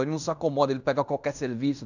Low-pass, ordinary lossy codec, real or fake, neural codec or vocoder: 7.2 kHz; none; fake; codec, 16 kHz in and 24 kHz out, 0.9 kbps, LongCat-Audio-Codec, fine tuned four codebook decoder